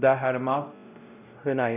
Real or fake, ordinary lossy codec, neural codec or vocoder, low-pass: fake; none; codec, 16 kHz, 0.5 kbps, X-Codec, WavLM features, trained on Multilingual LibriSpeech; 3.6 kHz